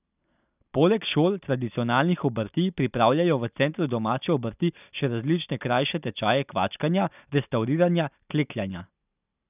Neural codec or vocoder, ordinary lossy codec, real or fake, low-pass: none; none; real; 3.6 kHz